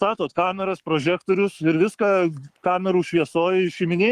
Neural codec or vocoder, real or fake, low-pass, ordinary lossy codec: codec, 44.1 kHz, 7.8 kbps, Pupu-Codec; fake; 14.4 kHz; Opus, 32 kbps